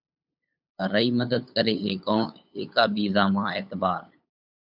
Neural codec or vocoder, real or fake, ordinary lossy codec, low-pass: codec, 16 kHz, 8 kbps, FunCodec, trained on LibriTTS, 25 frames a second; fake; AAC, 48 kbps; 5.4 kHz